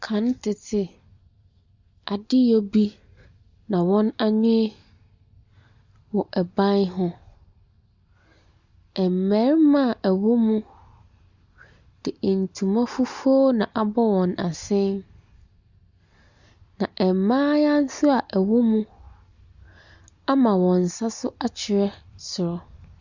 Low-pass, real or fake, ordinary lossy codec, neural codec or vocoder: 7.2 kHz; real; Opus, 64 kbps; none